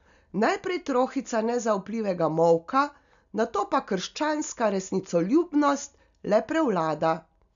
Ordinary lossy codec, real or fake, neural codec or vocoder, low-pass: none; real; none; 7.2 kHz